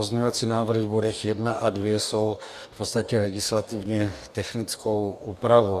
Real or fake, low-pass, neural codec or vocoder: fake; 14.4 kHz; codec, 44.1 kHz, 2.6 kbps, DAC